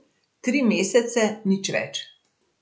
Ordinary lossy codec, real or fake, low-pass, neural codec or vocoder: none; real; none; none